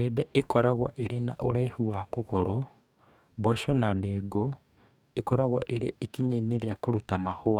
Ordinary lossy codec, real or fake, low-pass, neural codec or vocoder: none; fake; 19.8 kHz; codec, 44.1 kHz, 2.6 kbps, DAC